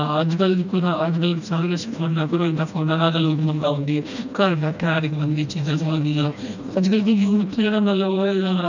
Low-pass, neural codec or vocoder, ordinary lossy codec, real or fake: 7.2 kHz; codec, 16 kHz, 1 kbps, FreqCodec, smaller model; none; fake